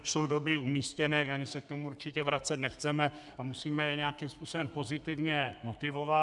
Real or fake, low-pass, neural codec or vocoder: fake; 10.8 kHz; codec, 32 kHz, 1.9 kbps, SNAC